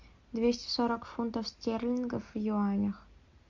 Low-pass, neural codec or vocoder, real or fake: 7.2 kHz; none; real